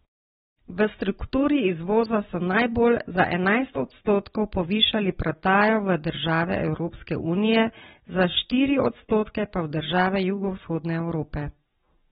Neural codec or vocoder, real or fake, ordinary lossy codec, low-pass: none; real; AAC, 16 kbps; 19.8 kHz